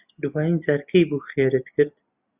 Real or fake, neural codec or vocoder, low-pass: real; none; 3.6 kHz